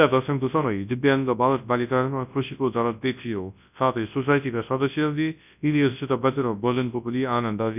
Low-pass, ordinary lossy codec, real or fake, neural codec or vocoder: 3.6 kHz; none; fake; codec, 24 kHz, 0.9 kbps, WavTokenizer, large speech release